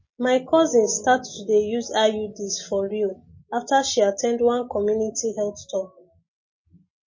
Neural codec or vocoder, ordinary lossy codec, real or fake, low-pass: none; MP3, 32 kbps; real; 7.2 kHz